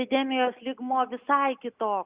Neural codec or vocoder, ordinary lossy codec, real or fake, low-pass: none; Opus, 64 kbps; real; 3.6 kHz